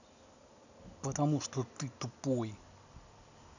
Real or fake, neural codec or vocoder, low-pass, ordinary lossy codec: real; none; 7.2 kHz; none